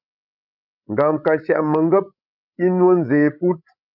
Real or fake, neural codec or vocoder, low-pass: fake; codec, 16 kHz, 16 kbps, FreqCodec, larger model; 5.4 kHz